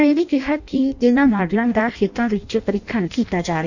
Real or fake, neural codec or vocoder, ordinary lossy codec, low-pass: fake; codec, 16 kHz in and 24 kHz out, 0.6 kbps, FireRedTTS-2 codec; none; 7.2 kHz